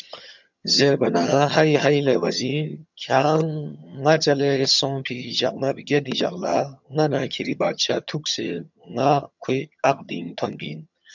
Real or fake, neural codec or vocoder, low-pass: fake; vocoder, 22.05 kHz, 80 mel bands, HiFi-GAN; 7.2 kHz